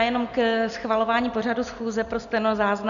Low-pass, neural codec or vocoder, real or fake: 7.2 kHz; none; real